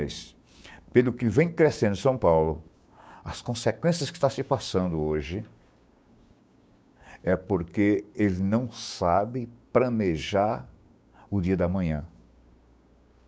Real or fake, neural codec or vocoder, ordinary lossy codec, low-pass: fake; codec, 16 kHz, 6 kbps, DAC; none; none